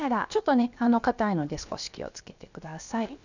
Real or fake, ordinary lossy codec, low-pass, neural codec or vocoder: fake; none; 7.2 kHz; codec, 16 kHz, about 1 kbps, DyCAST, with the encoder's durations